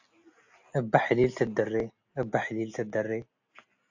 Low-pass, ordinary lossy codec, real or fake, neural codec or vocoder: 7.2 kHz; AAC, 48 kbps; real; none